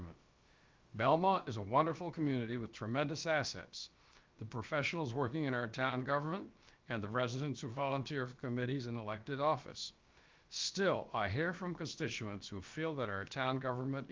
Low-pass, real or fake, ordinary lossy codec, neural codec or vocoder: 7.2 kHz; fake; Opus, 32 kbps; codec, 16 kHz, about 1 kbps, DyCAST, with the encoder's durations